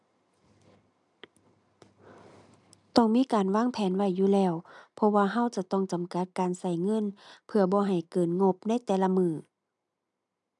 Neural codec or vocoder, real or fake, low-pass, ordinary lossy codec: none; real; 10.8 kHz; none